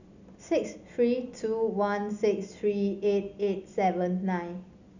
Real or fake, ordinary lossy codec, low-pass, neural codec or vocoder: real; none; 7.2 kHz; none